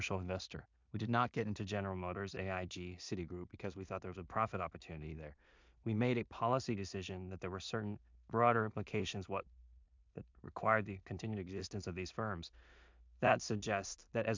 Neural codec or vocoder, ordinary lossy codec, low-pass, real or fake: codec, 16 kHz in and 24 kHz out, 0.4 kbps, LongCat-Audio-Codec, two codebook decoder; MP3, 64 kbps; 7.2 kHz; fake